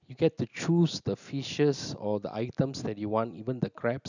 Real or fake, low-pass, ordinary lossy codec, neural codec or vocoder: real; 7.2 kHz; none; none